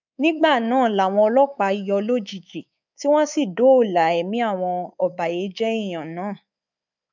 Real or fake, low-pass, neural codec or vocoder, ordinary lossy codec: fake; 7.2 kHz; codec, 24 kHz, 3.1 kbps, DualCodec; none